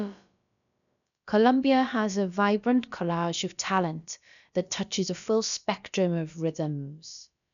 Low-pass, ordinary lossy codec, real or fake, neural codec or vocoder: 7.2 kHz; none; fake; codec, 16 kHz, about 1 kbps, DyCAST, with the encoder's durations